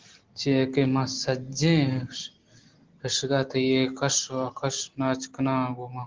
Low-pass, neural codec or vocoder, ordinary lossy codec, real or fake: 7.2 kHz; none; Opus, 16 kbps; real